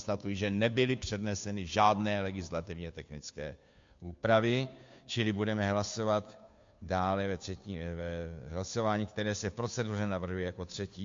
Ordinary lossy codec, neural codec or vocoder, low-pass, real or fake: MP3, 48 kbps; codec, 16 kHz, 2 kbps, FunCodec, trained on Chinese and English, 25 frames a second; 7.2 kHz; fake